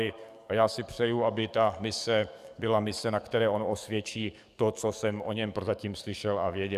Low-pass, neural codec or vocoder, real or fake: 14.4 kHz; codec, 44.1 kHz, 7.8 kbps, DAC; fake